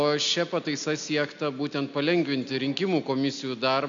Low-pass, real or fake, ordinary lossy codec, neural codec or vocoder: 7.2 kHz; real; MP3, 64 kbps; none